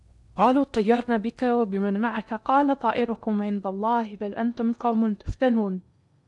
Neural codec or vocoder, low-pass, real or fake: codec, 16 kHz in and 24 kHz out, 0.8 kbps, FocalCodec, streaming, 65536 codes; 10.8 kHz; fake